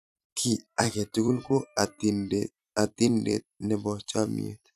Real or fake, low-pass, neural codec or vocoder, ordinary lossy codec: fake; 14.4 kHz; vocoder, 44.1 kHz, 128 mel bands every 256 samples, BigVGAN v2; none